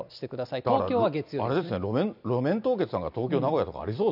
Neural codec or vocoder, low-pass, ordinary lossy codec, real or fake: none; 5.4 kHz; none; real